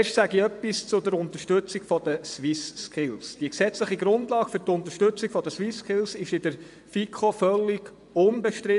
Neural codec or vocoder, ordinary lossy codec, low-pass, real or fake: vocoder, 24 kHz, 100 mel bands, Vocos; MP3, 96 kbps; 10.8 kHz; fake